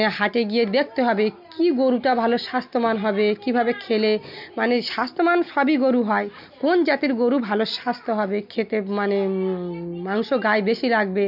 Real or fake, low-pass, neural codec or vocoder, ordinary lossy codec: real; 5.4 kHz; none; none